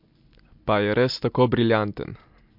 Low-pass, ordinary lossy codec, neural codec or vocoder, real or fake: 5.4 kHz; MP3, 48 kbps; none; real